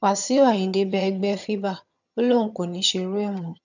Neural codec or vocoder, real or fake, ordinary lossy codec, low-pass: vocoder, 22.05 kHz, 80 mel bands, HiFi-GAN; fake; none; 7.2 kHz